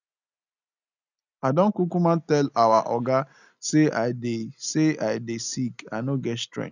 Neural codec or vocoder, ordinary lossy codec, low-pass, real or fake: none; none; 7.2 kHz; real